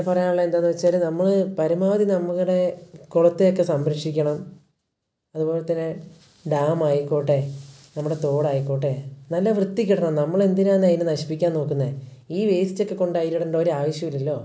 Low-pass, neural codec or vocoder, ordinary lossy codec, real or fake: none; none; none; real